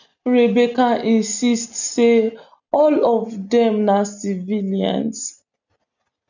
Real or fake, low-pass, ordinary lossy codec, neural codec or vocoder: real; 7.2 kHz; none; none